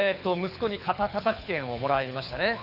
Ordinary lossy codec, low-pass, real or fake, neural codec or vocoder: none; 5.4 kHz; fake; codec, 24 kHz, 6 kbps, HILCodec